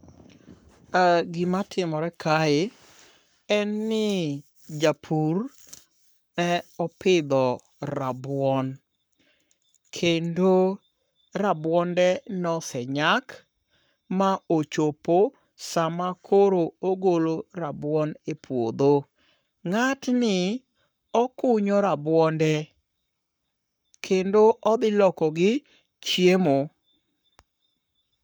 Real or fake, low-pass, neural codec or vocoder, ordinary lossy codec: fake; none; codec, 44.1 kHz, 7.8 kbps, Pupu-Codec; none